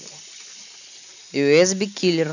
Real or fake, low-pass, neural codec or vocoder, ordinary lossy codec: real; 7.2 kHz; none; none